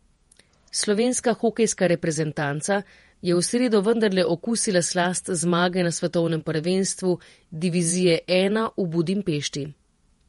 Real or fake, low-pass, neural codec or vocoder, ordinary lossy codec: fake; 19.8 kHz; vocoder, 48 kHz, 128 mel bands, Vocos; MP3, 48 kbps